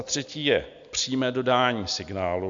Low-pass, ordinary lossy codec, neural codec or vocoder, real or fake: 7.2 kHz; MP3, 64 kbps; none; real